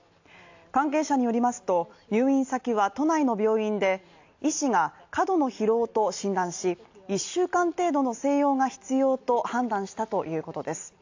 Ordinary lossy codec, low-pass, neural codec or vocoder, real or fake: AAC, 48 kbps; 7.2 kHz; none; real